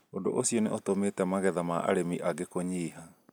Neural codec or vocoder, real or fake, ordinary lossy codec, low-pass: none; real; none; none